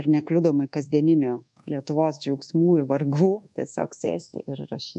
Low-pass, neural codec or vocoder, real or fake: 10.8 kHz; codec, 24 kHz, 1.2 kbps, DualCodec; fake